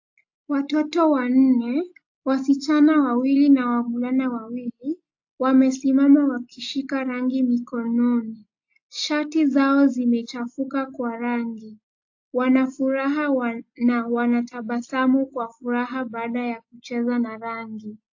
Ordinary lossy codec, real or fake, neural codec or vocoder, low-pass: AAC, 48 kbps; real; none; 7.2 kHz